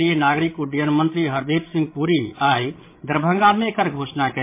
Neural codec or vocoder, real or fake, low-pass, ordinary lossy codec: codec, 16 kHz, 16 kbps, FreqCodec, larger model; fake; 3.6 kHz; MP3, 24 kbps